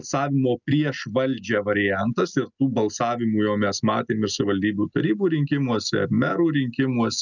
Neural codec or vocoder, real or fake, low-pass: none; real; 7.2 kHz